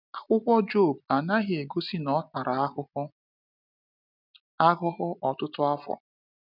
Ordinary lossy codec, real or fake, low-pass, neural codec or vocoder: none; real; 5.4 kHz; none